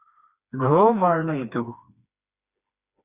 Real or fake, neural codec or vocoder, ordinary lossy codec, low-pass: fake; codec, 16 kHz, 2 kbps, FreqCodec, smaller model; Opus, 64 kbps; 3.6 kHz